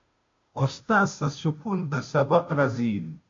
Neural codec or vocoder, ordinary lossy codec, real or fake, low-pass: codec, 16 kHz, 0.5 kbps, FunCodec, trained on Chinese and English, 25 frames a second; MP3, 48 kbps; fake; 7.2 kHz